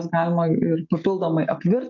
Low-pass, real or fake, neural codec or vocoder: 7.2 kHz; real; none